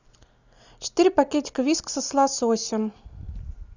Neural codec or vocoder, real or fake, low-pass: none; real; 7.2 kHz